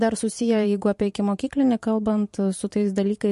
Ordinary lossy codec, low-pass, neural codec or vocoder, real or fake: MP3, 48 kbps; 14.4 kHz; vocoder, 44.1 kHz, 128 mel bands every 512 samples, BigVGAN v2; fake